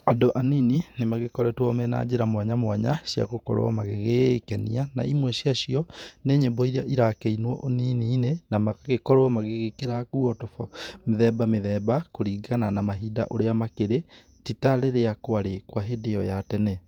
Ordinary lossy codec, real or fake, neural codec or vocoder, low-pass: none; fake; vocoder, 48 kHz, 128 mel bands, Vocos; 19.8 kHz